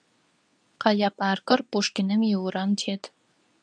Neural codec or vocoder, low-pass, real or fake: codec, 24 kHz, 0.9 kbps, WavTokenizer, medium speech release version 2; 9.9 kHz; fake